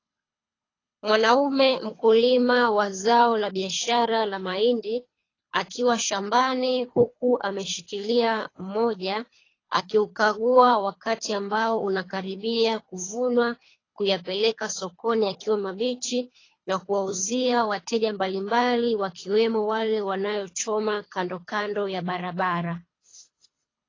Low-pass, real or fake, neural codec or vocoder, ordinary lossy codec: 7.2 kHz; fake; codec, 24 kHz, 3 kbps, HILCodec; AAC, 32 kbps